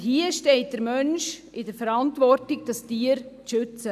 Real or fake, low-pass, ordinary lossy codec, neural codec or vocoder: real; 14.4 kHz; none; none